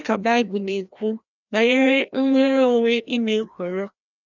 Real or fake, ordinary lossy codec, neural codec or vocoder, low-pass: fake; none; codec, 16 kHz, 1 kbps, FreqCodec, larger model; 7.2 kHz